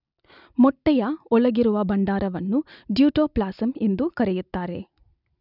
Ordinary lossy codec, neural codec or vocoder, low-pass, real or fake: none; none; 5.4 kHz; real